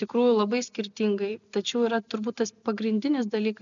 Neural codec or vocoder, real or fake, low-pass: none; real; 7.2 kHz